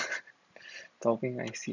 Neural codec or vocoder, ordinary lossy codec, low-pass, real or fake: none; none; 7.2 kHz; real